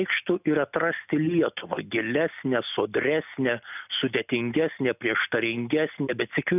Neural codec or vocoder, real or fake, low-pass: none; real; 3.6 kHz